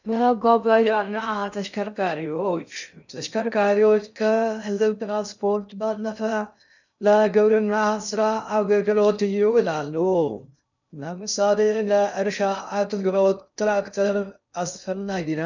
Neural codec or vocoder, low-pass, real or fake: codec, 16 kHz in and 24 kHz out, 0.6 kbps, FocalCodec, streaming, 2048 codes; 7.2 kHz; fake